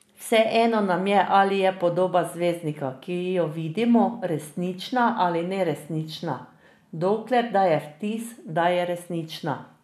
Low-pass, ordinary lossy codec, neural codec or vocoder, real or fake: 14.4 kHz; none; none; real